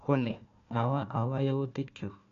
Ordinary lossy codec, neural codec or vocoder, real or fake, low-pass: AAC, 96 kbps; codec, 16 kHz, 1 kbps, FunCodec, trained on Chinese and English, 50 frames a second; fake; 7.2 kHz